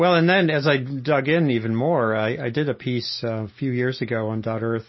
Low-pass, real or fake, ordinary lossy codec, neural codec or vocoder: 7.2 kHz; real; MP3, 24 kbps; none